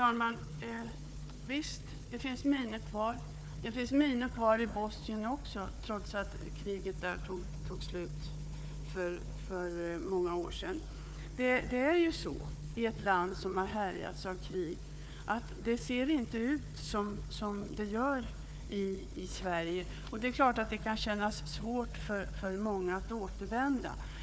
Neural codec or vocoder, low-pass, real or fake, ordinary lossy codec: codec, 16 kHz, 4 kbps, FunCodec, trained on Chinese and English, 50 frames a second; none; fake; none